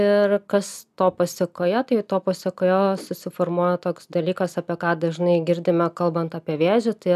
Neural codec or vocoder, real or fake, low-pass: none; real; 14.4 kHz